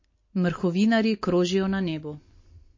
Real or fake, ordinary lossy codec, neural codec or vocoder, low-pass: real; MP3, 32 kbps; none; 7.2 kHz